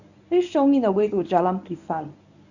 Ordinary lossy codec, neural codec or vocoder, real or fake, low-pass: none; codec, 24 kHz, 0.9 kbps, WavTokenizer, medium speech release version 1; fake; 7.2 kHz